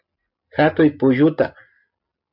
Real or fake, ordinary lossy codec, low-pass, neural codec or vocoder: real; MP3, 48 kbps; 5.4 kHz; none